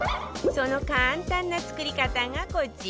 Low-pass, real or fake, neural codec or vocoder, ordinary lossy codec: none; real; none; none